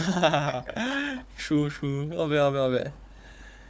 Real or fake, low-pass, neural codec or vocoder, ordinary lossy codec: fake; none; codec, 16 kHz, 4 kbps, FunCodec, trained on Chinese and English, 50 frames a second; none